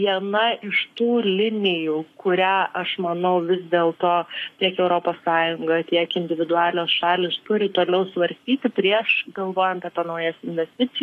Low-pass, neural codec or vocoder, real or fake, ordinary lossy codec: 14.4 kHz; codec, 44.1 kHz, 7.8 kbps, Pupu-Codec; fake; AAC, 64 kbps